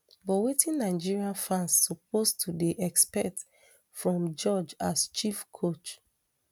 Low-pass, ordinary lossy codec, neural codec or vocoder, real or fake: none; none; none; real